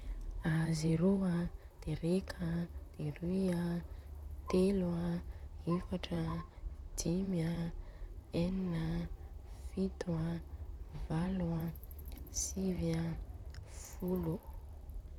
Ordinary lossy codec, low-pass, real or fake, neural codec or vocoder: none; 19.8 kHz; fake; vocoder, 44.1 kHz, 128 mel bands, Pupu-Vocoder